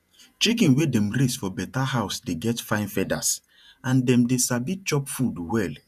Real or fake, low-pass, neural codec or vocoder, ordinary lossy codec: fake; 14.4 kHz; vocoder, 48 kHz, 128 mel bands, Vocos; none